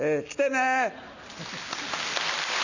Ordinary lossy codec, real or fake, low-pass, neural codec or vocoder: none; real; 7.2 kHz; none